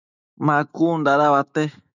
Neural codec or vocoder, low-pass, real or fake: autoencoder, 48 kHz, 128 numbers a frame, DAC-VAE, trained on Japanese speech; 7.2 kHz; fake